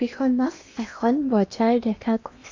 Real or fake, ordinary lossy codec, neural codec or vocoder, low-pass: fake; AAC, 48 kbps; codec, 16 kHz in and 24 kHz out, 0.8 kbps, FocalCodec, streaming, 65536 codes; 7.2 kHz